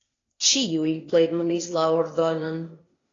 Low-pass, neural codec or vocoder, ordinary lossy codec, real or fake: 7.2 kHz; codec, 16 kHz, 0.8 kbps, ZipCodec; AAC, 32 kbps; fake